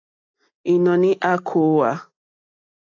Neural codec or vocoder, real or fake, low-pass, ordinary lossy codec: none; real; 7.2 kHz; AAC, 48 kbps